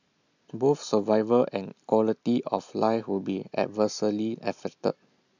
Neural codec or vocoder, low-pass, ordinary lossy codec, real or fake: none; 7.2 kHz; none; real